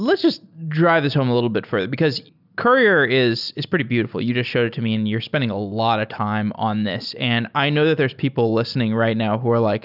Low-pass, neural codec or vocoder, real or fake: 5.4 kHz; none; real